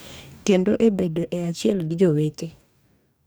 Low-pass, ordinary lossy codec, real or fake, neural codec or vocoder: none; none; fake; codec, 44.1 kHz, 2.6 kbps, DAC